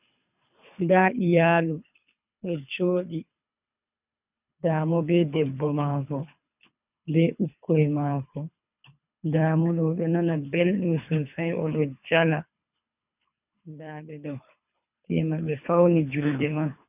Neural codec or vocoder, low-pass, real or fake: codec, 24 kHz, 3 kbps, HILCodec; 3.6 kHz; fake